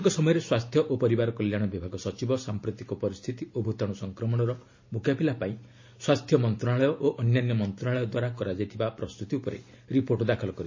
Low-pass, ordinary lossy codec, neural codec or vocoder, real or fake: 7.2 kHz; MP3, 32 kbps; none; real